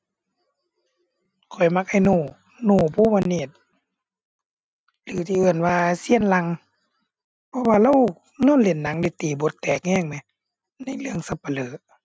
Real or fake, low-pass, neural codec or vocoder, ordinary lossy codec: real; none; none; none